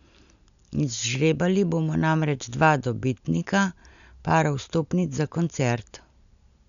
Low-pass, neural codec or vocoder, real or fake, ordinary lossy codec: 7.2 kHz; none; real; none